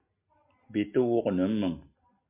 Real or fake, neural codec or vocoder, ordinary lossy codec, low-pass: real; none; MP3, 32 kbps; 3.6 kHz